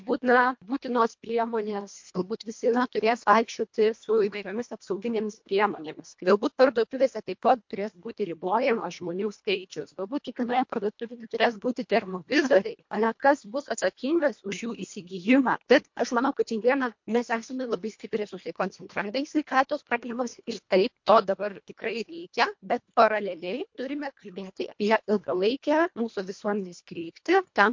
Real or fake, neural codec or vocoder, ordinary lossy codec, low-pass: fake; codec, 24 kHz, 1.5 kbps, HILCodec; MP3, 48 kbps; 7.2 kHz